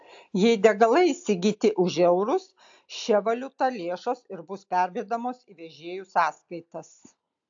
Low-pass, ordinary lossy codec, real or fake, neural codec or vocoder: 7.2 kHz; AAC, 64 kbps; real; none